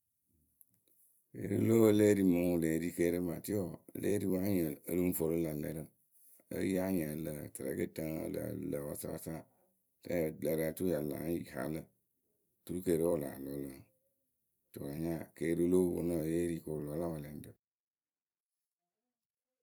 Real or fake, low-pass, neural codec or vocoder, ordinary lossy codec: fake; none; vocoder, 44.1 kHz, 128 mel bands every 256 samples, BigVGAN v2; none